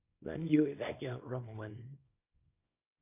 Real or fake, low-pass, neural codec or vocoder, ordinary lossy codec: fake; 3.6 kHz; codec, 24 kHz, 0.9 kbps, WavTokenizer, small release; AAC, 24 kbps